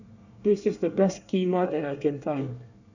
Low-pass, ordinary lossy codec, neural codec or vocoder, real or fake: 7.2 kHz; none; codec, 24 kHz, 1 kbps, SNAC; fake